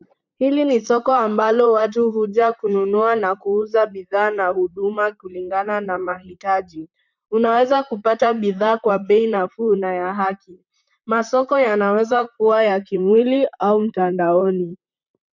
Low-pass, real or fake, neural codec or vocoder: 7.2 kHz; fake; vocoder, 44.1 kHz, 128 mel bands, Pupu-Vocoder